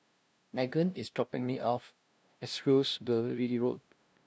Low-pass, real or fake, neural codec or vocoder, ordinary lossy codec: none; fake; codec, 16 kHz, 0.5 kbps, FunCodec, trained on LibriTTS, 25 frames a second; none